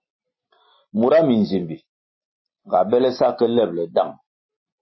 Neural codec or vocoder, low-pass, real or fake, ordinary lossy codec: none; 7.2 kHz; real; MP3, 24 kbps